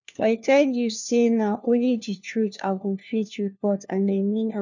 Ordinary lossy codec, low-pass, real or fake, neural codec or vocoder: none; 7.2 kHz; fake; codec, 16 kHz, 1 kbps, FunCodec, trained on LibriTTS, 50 frames a second